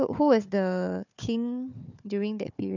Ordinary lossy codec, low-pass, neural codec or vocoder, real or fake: none; 7.2 kHz; codec, 16 kHz, 4 kbps, FunCodec, trained on Chinese and English, 50 frames a second; fake